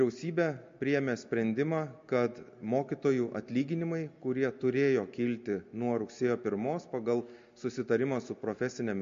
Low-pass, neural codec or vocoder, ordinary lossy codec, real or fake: 7.2 kHz; none; MP3, 48 kbps; real